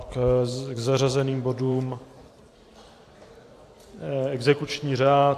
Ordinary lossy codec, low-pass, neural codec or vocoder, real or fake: AAC, 64 kbps; 14.4 kHz; vocoder, 44.1 kHz, 128 mel bands every 512 samples, BigVGAN v2; fake